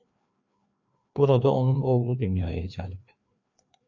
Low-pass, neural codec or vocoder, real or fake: 7.2 kHz; codec, 16 kHz, 2 kbps, FreqCodec, larger model; fake